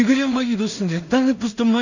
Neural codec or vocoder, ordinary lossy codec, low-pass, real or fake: codec, 16 kHz in and 24 kHz out, 0.4 kbps, LongCat-Audio-Codec, two codebook decoder; none; 7.2 kHz; fake